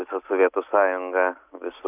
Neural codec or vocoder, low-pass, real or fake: none; 3.6 kHz; real